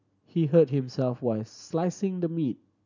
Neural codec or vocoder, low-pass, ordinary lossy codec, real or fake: none; 7.2 kHz; none; real